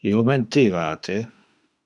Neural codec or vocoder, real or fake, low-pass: autoencoder, 48 kHz, 32 numbers a frame, DAC-VAE, trained on Japanese speech; fake; 10.8 kHz